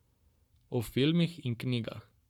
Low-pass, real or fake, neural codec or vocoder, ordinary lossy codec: 19.8 kHz; fake; codec, 44.1 kHz, 7.8 kbps, Pupu-Codec; none